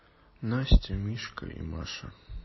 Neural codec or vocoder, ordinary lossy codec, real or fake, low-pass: none; MP3, 24 kbps; real; 7.2 kHz